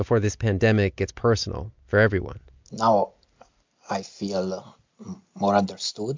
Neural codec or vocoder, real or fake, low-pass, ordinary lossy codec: none; real; 7.2 kHz; MP3, 64 kbps